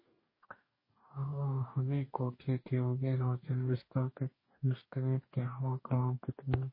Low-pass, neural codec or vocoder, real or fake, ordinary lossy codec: 5.4 kHz; codec, 44.1 kHz, 2.6 kbps, DAC; fake; MP3, 24 kbps